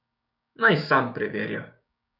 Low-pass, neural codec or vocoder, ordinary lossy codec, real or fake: 5.4 kHz; codec, 16 kHz, 6 kbps, DAC; none; fake